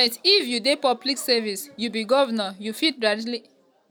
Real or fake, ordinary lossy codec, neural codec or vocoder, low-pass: real; none; none; none